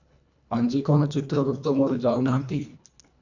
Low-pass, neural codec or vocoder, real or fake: 7.2 kHz; codec, 24 kHz, 1.5 kbps, HILCodec; fake